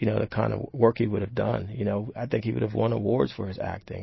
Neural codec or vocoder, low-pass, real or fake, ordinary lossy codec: none; 7.2 kHz; real; MP3, 24 kbps